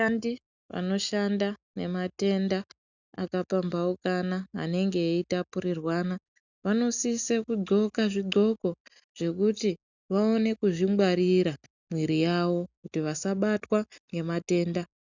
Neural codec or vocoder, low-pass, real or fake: none; 7.2 kHz; real